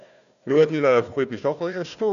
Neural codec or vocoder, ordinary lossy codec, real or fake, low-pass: codec, 16 kHz, 1 kbps, FunCodec, trained on Chinese and English, 50 frames a second; none; fake; 7.2 kHz